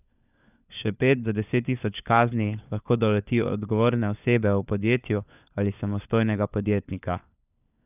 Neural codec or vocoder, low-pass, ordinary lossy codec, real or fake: codec, 16 kHz, 4 kbps, FunCodec, trained on LibriTTS, 50 frames a second; 3.6 kHz; none; fake